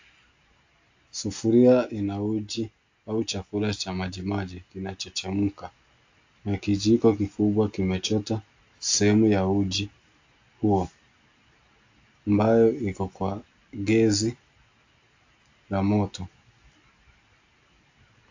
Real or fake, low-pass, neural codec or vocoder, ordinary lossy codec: real; 7.2 kHz; none; AAC, 48 kbps